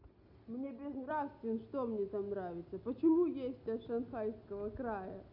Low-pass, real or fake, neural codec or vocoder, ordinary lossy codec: 5.4 kHz; real; none; none